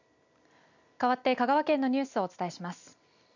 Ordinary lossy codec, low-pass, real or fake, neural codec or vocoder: none; 7.2 kHz; real; none